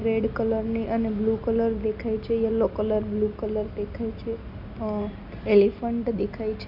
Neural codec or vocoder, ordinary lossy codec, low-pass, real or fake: none; none; 5.4 kHz; real